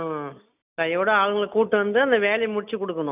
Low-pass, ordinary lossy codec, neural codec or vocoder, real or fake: 3.6 kHz; none; none; real